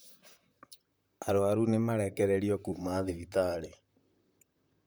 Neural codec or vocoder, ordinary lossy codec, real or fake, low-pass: vocoder, 44.1 kHz, 128 mel bands, Pupu-Vocoder; none; fake; none